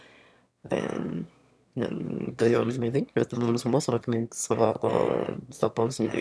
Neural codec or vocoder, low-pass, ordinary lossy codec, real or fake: autoencoder, 22.05 kHz, a latent of 192 numbers a frame, VITS, trained on one speaker; none; none; fake